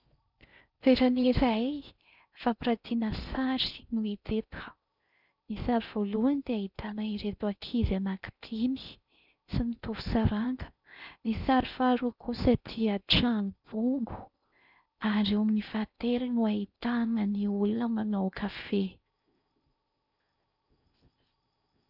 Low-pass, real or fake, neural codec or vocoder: 5.4 kHz; fake; codec, 16 kHz in and 24 kHz out, 0.6 kbps, FocalCodec, streaming, 4096 codes